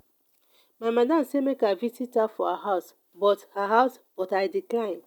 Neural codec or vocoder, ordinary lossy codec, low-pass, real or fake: none; none; 19.8 kHz; real